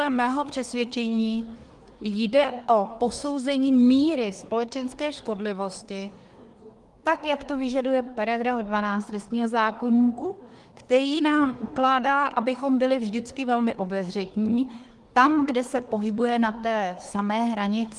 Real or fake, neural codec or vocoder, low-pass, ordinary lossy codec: fake; codec, 24 kHz, 1 kbps, SNAC; 10.8 kHz; Opus, 24 kbps